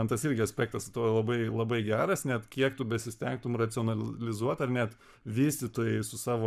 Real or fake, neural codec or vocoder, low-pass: fake; codec, 44.1 kHz, 7.8 kbps, Pupu-Codec; 14.4 kHz